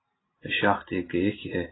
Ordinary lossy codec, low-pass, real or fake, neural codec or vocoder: AAC, 16 kbps; 7.2 kHz; real; none